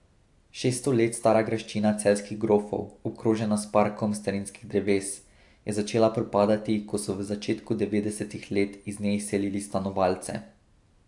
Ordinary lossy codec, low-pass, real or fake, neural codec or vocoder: AAC, 64 kbps; 10.8 kHz; real; none